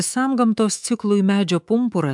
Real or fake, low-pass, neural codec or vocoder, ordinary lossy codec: fake; 10.8 kHz; codec, 24 kHz, 3.1 kbps, DualCodec; MP3, 96 kbps